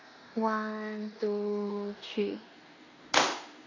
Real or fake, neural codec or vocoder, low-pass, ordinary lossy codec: fake; codec, 16 kHz in and 24 kHz out, 0.9 kbps, LongCat-Audio-Codec, four codebook decoder; 7.2 kHz; AAC, 32 kbps